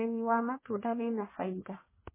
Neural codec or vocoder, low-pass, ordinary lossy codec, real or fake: codec, 44.1 kHz, 1.7 kbps, Pupu-Codec; 3.6 kHz; MP3, 16 kbps; fake